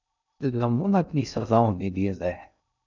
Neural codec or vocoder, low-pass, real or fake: codec, 16 kHz in and 24 kHz out, 0.6 kbps, FocalCodec, streaming, 2048 codes; 7.2 kHz; fake